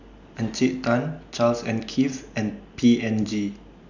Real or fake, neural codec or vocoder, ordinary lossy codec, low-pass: real; none; none; 7.2 kHz